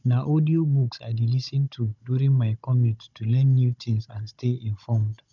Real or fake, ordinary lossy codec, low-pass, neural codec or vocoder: fake; none; 7.2 kHz; codec, 16 kHz, 16 kbps, FunCodec, trained on Chinese and English, 50 frames a second